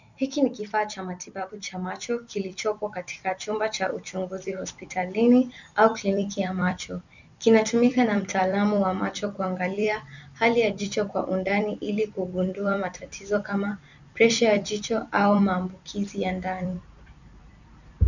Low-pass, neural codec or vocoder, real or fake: 7.2 kHz; vocoder, 44.1 kHz, 128 mel bands every 256 samples, BigVGAN v2; fake